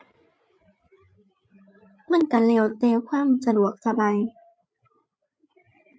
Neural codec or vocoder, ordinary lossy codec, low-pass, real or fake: codec, 16 kHz, 8 kbps, FreqCodec, larger model; none; none; fake